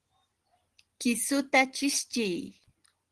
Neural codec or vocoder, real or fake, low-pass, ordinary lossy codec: none; real; 10.8 kHz; Opus, 16 kbps